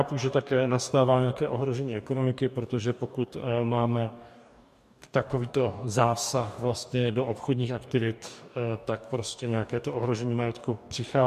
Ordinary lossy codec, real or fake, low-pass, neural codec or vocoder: MP3, 96 kbps; fake; 14.4 kHz; codec, 44.1 kHz, 2.6 kbps, DAC